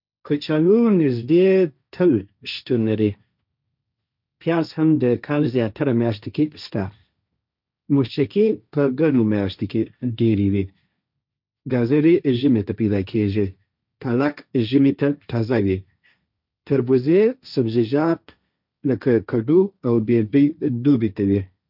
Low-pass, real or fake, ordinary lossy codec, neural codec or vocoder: 5.4 kHz; fake; none; codec, 16 kHz, 1.1 kbps, Voila-Tokenizer